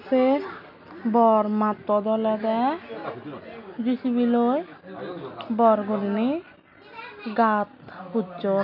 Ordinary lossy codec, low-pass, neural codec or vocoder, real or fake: none; 5.4 kHz; none; real